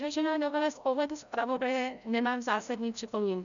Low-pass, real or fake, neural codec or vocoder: 7.2 kHz; fake; codec, 16 kHz, 0.5 kbps, FreqCodec, larger model